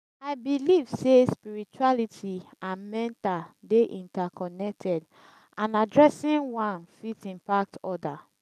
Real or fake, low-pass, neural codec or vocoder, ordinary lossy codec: real; 14.4 kHz; none; none